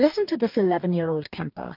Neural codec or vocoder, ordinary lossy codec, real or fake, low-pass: codec, 44.1 kHz, 2.6 kbps, DAC; MP3, 48 kbps; fake; 5.4 kHz